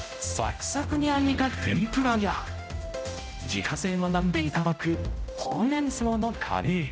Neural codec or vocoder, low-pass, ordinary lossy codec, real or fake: codec, 16 kHz, 0.5 kbps, X-Codec, HuBERT features, trained on general audio; none; none; fake